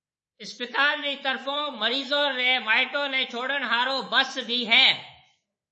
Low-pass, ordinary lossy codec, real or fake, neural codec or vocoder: 10.8 kHz; MP3, 32 kbps; fake; codec, 24 kHz, 3.1 kbps, DualCodec